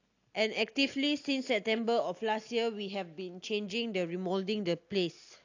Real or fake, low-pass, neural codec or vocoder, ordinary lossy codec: real; 7.2 kHz; none; AAC, 48 kbps